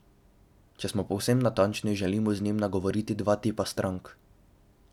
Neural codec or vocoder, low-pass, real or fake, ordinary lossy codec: none; 19.8 kHz; real; none